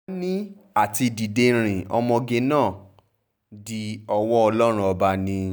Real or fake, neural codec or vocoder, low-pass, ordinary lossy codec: real; none; none; none